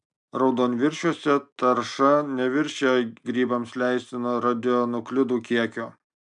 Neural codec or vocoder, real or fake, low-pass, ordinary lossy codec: none; real; 9.9 kHz; MP3, 96 kbps